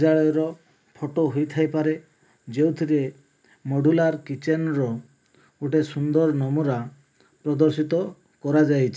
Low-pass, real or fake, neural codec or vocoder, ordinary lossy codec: none; real; none; none